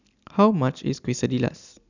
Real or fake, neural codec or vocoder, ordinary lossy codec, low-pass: real; none; none; 7.2 kHz